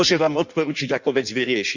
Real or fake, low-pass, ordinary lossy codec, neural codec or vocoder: fake; 7.2 kHz; none; codec, 16 kHz in and 24 kHz out, 1.1 kbps, FireRedTTS-2 codec